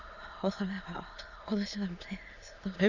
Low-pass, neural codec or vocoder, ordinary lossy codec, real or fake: 7.2 kHz; autoencoder, 22.05 kHz, a latent of 192 numbers a frame, VITS, trained on many speakers; none; fake